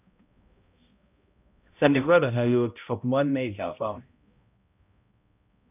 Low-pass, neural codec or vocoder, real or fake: 3.6 kHz; codec, 16 kHz, 0.5 kbps, X-Codec, HuBERT features, trained on balanced general audio; fake